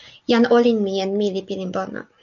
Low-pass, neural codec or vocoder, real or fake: 7.2 kHz; none; real